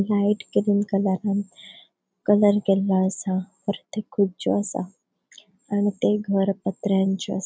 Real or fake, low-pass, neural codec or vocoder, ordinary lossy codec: real; none; none; none